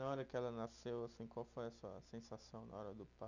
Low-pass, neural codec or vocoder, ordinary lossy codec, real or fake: 7.2 kHz; none; none; real